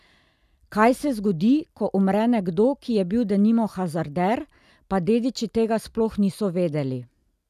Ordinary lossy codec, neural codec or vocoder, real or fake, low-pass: none; none; real; 14.4 kHz